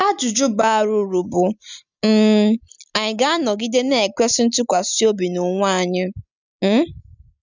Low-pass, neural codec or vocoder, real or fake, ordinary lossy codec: 7.2 kHz; none; real; none